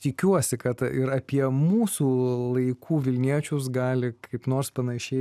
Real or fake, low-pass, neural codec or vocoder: real; 14.4 kHz; none